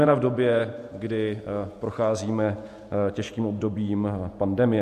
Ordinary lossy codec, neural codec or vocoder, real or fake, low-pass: MP3, 64 kbps; vocoder, 48 kHz, 128 mel bands, Vocos; fake; 14.4 kHz